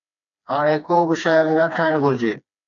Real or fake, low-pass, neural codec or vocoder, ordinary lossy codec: fake; 7.2 kHz; codec, 16 kHz, 2 kbps, FreqCodec, smaller model; MP3, 96 kbps